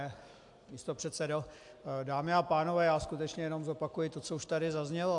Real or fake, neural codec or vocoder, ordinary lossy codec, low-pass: real; none; AAC, 64 kbps; 10.8 kHz